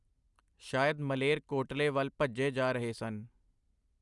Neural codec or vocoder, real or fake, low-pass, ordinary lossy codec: none; real; 10.8 kHz; Opus, 64 kbps